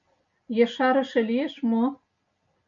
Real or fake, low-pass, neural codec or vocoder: real; 7.2 kHz; none